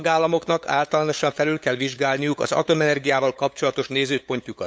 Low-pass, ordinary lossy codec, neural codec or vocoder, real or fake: none; none; codec, 16 kHz, 8 kbps, FunCodec, trained on LibriTTS, 25 frames a second; fake